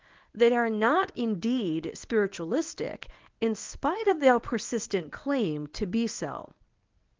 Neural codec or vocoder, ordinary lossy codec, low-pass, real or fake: codec, 24 kHz, 0.9 kbps, WavTokenizer, medium speech release version 1; Opus, 32 kbps; 7.2 kHz; fake